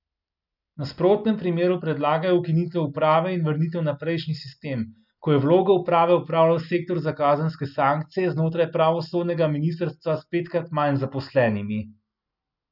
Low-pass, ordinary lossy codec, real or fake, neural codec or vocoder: 5.4 kHz; none; real; none